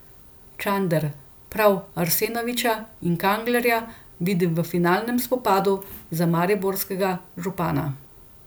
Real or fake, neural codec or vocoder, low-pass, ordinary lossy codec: real; none; none; none